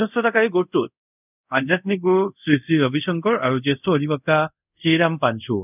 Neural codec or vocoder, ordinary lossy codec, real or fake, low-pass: codec, 24 kHz, 0.5 kbps, DualCodec; none; fake; 3.6 kHz